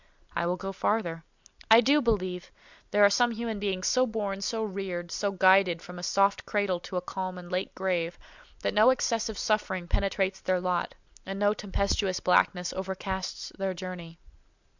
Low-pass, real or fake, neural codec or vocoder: 7.2 kHz; real; none